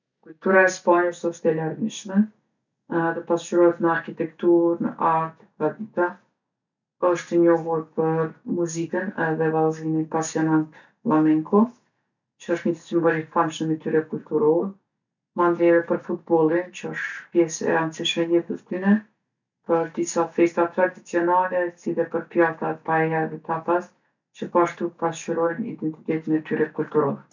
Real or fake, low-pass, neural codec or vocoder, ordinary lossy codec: real; 7.2 kHz; none; none